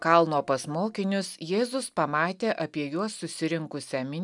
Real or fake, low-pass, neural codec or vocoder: real; 10.8 kHz; none